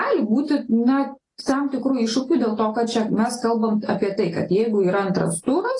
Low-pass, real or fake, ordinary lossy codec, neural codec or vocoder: 10.8 kHz; real; AAC, 32 kbps; none